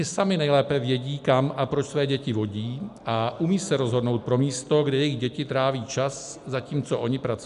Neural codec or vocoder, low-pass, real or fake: none; 10.8 kHz; real